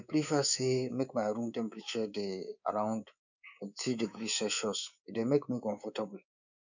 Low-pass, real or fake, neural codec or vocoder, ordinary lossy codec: 7.2 kHz; fake; codec, 24 kHz, 3.1 kbps, DualCodec; none